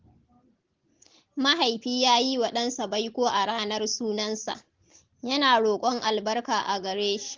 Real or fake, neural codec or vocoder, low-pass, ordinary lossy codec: real; none; 7.2 kHz; Opus, 16 kbps